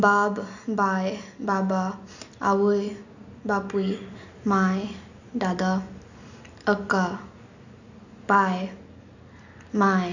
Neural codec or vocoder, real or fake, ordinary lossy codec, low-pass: none; real; none; 7.2 kHz